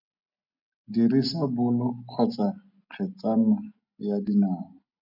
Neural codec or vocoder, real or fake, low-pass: none; real; 5.4 kHz